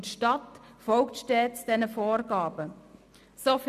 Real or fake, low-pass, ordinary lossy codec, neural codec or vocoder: real; 14.4 kHz; none; none